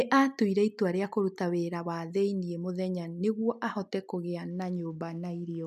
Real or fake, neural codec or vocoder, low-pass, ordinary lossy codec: real; none; 14.4 kHz; MP3, 96 kbps